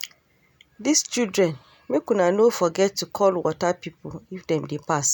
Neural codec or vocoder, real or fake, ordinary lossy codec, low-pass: none; real; none; none